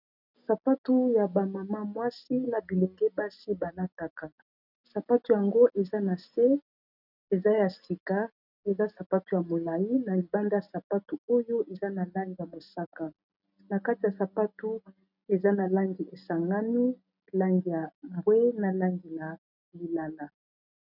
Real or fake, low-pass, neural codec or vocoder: real; 5.4 kHz; none